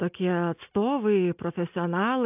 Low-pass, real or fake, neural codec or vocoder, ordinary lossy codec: 3.6 kHz; real; none; AAC, 32 kbps